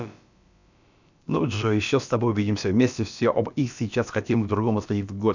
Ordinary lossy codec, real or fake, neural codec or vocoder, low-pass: none; fake; codec, 16 kHz, about 1 kbps, DyCAST, with the encoder's durations; 7.2 kHz